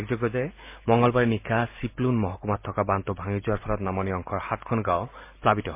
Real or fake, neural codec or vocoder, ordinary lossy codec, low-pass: real; none; MP3, 24 kbps; 3.6 kHz